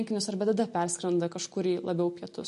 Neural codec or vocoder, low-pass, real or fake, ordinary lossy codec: none; 14.4 kHz; real; MP3, 48 kbps